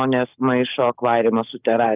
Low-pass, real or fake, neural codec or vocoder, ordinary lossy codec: 3.6 kHz; real; none; Opus, 32 kbps